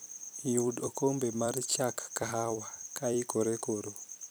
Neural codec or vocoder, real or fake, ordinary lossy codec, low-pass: vocoder, 44.1 kHz, 128 mel bands every 256 samples, BigVGAN v2; fake; none; none